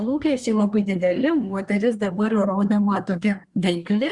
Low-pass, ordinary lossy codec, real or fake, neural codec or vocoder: 10.8 kHz; Opus, 64 kbps; fake; codec, 24 kHz, 1 kbps, SNAC